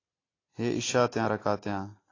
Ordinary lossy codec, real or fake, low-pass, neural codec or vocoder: AAC, 32 kbps; real; 7.2 kHz; none